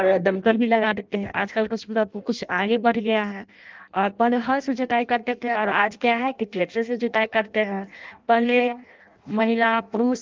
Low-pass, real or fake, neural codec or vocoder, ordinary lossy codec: 7.2 kHz; fake; codec, 16 kHz in and 24 kHz out, 0.6 kbps, FireRedTTS-2 codec; Opus, 32 kbps